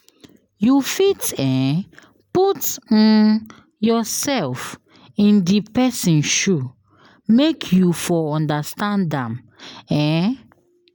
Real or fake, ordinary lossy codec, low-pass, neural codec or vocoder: real; none; none; none